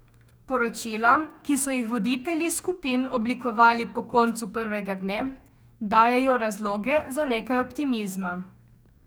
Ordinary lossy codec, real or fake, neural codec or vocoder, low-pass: none; fake; codec, 44.1 kHz, 2.6 kbps, DAC; none